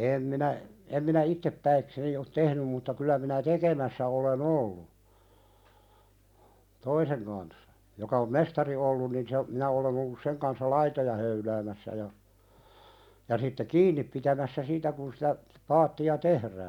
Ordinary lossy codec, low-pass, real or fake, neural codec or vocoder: none; 19.8 kHz; real; none